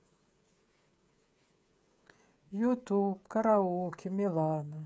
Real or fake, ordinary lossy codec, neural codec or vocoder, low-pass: fake; none; codec, 16 kHz, 16 kbps, FreqCodec, smaller model; none